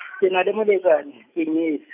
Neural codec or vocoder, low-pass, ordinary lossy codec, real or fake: autoencoder, 48 kHz, 128 numbers a frame, DAC-VAE, trained on Japanese speech; 3.6 kHz; none; fake